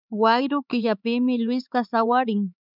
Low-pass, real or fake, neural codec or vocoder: 5.4 kHz; fake; codec, 16 kHz, 4 kbps, X-Codec, HuBERT features, trained on LibriSpeech